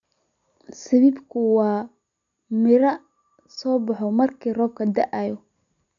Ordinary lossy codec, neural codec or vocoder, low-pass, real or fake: none; none; 7.2 kHz; real